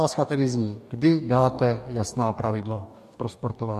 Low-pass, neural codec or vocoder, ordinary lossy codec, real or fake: 14.4 kHz; codec, 44.1 kHz, 2.6 kbps, DAC; MP3, 64 kbps; fake